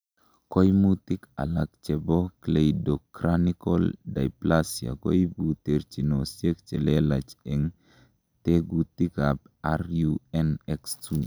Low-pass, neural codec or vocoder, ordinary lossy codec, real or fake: none; none; none; real